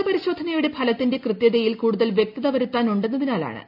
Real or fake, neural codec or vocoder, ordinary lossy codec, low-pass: real; none; AAC, 48 kbps; 5.4 kHz